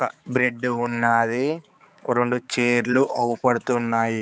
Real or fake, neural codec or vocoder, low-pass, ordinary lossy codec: fake; codec, 16 kHz, 4 kbps, X-Codec, HuBERT features, trained on balanced general audio; none; none